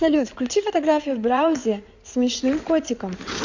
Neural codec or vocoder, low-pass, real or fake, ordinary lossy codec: codec, 16 kHz in and 24 kHz out, 2.2 kbps, FireRedTTS-2 codec; 7.2 kHz; fake; none